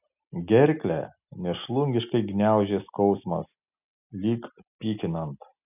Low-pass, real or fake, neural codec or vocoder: 3.6 kHz; real; none